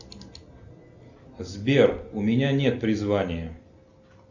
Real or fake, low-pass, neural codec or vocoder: real; 7.2 kHz; none